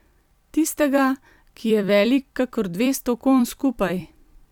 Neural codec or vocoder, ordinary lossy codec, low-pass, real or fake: vocoder, 44.1 kHz, 128 mel bands every 256 samples, BigVGAN v2; none; 19.8 kHz; fake